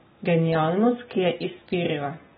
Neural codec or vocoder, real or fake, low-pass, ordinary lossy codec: none; real; 7.2 kHz; AAC, 16 kbps